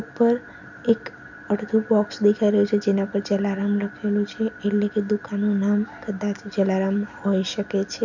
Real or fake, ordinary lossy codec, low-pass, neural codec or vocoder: real; none; 7.2 kHz; none